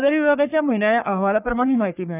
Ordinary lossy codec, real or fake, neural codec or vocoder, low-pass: none; fake; codec, 44.1 kHz, 3.4 kbps, Pupu-Codec; 3.6 kHz